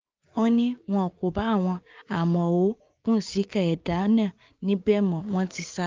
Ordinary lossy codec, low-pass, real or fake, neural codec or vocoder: Opus, 32 kbps; 7.2 kHz; fake; codec, 16 kHz, 6 kbps, DAC